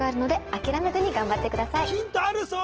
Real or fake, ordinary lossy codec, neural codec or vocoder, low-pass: real; Opus, 16 kbps; none; 7.2 kHz